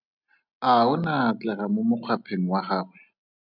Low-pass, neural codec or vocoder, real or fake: 5.4 kHz; none; real